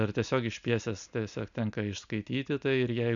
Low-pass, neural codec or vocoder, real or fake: 7.2 kHz; none; real